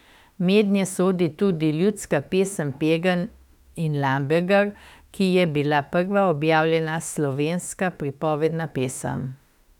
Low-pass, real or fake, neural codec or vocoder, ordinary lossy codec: 19.8 kHz; fake; autoencoder, 48 kHz, 32 numbers a frame, DAC-VAE, trained on Japanese speech; none